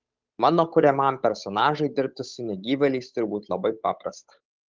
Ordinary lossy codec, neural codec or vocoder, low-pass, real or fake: Opus, 24 kbps; codec, 16 kHz, 8 kbps, FunCodec, trained on Chinese and English, 25 frames a second; 7.2 kHz; fake